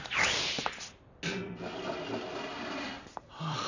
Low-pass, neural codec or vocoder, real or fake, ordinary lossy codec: 7.2 kHz; vocoder, 44.1 kHz, 128 mel bands every 256 samples, BigVGAN v2; fake; none